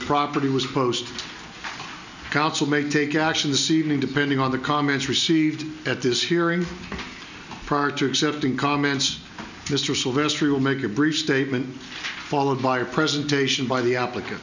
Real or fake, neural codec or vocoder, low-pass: real; none; 7.2 kHz